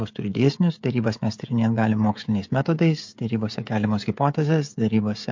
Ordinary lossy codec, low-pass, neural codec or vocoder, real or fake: MP3, 48 kbps; 7.2 kHz; codec, 16 kHz, 16 kbps, FreqCodec, smaller model; fake